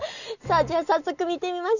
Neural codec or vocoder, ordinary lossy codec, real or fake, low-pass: none; none; real; 7.2 kHz